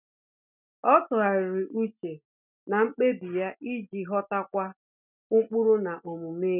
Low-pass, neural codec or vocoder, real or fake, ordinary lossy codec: 3.6 kHz; none; real; none